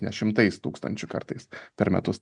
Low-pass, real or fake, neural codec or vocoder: 9.9 kHz; real; none